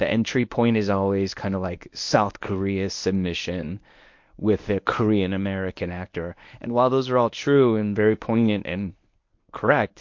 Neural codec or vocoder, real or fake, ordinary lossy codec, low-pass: codec, 24 kHz, 0.9 kbps, WavTokenizer, medium speech release version 1; fake; MP3, 48 kbps; 7.2 kHz